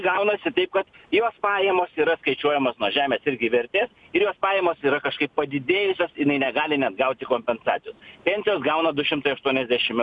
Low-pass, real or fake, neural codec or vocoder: 10.8 kHz; real; none